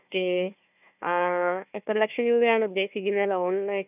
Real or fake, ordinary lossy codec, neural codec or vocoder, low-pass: fake; none; codec, 16 kHz, 1 kbps, FunCodec, trained on Chinese and English, 50 frames a second; 3.6 kHz